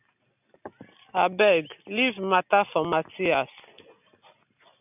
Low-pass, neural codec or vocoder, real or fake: 3.6 kHz; none; real